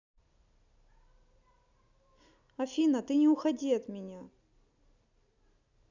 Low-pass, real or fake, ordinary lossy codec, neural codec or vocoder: 7.2 kHz; real; none; none